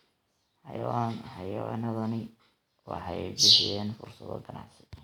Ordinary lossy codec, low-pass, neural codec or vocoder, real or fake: none; 19.8 kHz; none; real